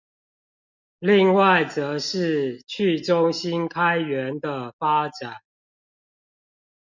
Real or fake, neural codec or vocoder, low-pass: real; none; 7.2 kHz